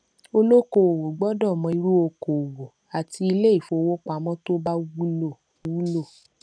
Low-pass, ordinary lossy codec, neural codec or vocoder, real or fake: 9.9 kHz; none; none; real